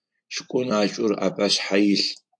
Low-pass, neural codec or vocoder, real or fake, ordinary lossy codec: 9.9 kHz; none; real; AAC, 64 kbps